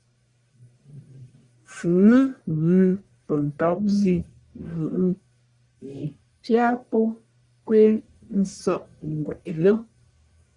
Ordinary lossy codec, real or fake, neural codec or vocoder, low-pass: Opus, 64 kbps; fake; codec, 44.1 kHz, 1.7 kbps, Pupu-Codec; 10.8 kHz